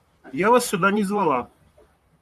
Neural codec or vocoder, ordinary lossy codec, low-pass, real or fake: vocoder, 44.1 kHz, 128 mel bands, Pupu-Vocoder; MP3, 96 kbps; 14.4 kHz; fake